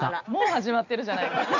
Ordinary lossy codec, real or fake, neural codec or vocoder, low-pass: none; real; none; 7.2 kHz